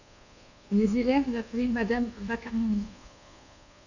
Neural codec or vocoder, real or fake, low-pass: codec, 24 kHz, 1.2 kbps, DualCodec; fake; 7.2 kHz